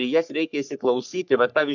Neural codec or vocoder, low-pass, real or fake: codec, 44.1 kHz, 3.4 kbps, Pupu-Codec; 7.2 kHz; fake